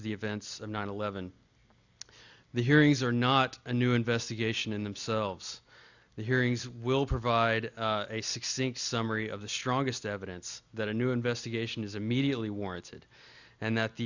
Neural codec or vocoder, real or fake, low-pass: none; real; 7.2 kHz